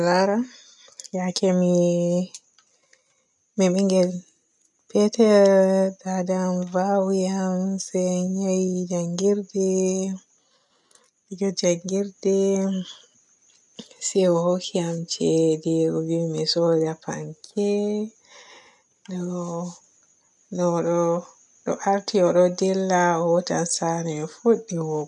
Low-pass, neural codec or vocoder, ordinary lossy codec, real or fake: 10.8 kHz; none; none; real